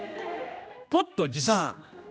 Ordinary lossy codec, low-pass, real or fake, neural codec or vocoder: none; none; fake; codec, 16 kHz, 1 kbps, X-Codec, HuBERT features, trained on general audio